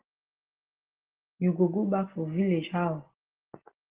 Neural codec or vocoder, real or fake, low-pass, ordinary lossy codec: none; real; 3.6 kHz; Opus, 16 kbps